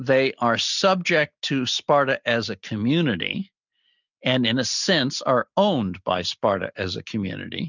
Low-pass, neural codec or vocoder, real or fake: 7.2 kHz; none; real